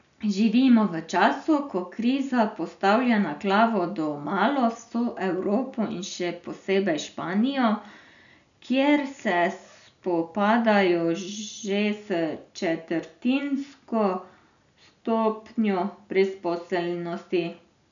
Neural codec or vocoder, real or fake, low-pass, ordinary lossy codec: none; real; 7.2 kHz; none